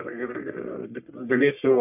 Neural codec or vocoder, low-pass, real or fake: codec, 44.1 kHz, 1.7 kbps, Pupu-Codec; 3.6 kHz; fake